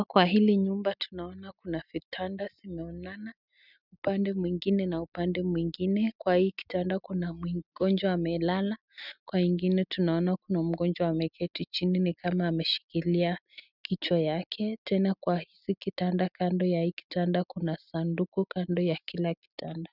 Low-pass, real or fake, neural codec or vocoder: 5.4 kHz; real; none